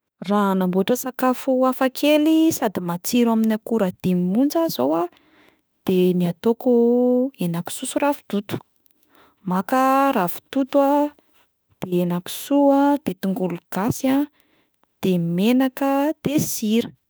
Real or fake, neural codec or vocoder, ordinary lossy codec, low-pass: fake; autoencoder, 48 kHz, 32 numbers a frame, DAC-VAE, trained on Japanese speech; none; none